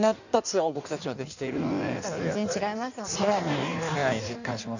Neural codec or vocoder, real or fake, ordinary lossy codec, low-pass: codec, 16 kHz in and 24 kHz out, 1.1 kbps, FireRedTTS-2 codec; fake; none; 7.2 kHz